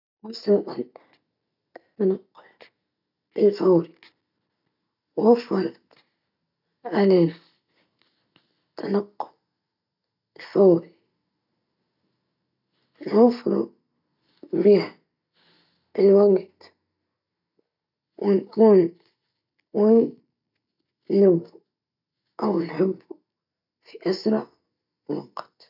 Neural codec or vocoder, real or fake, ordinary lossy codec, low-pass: none; real; none; 5.4 kHz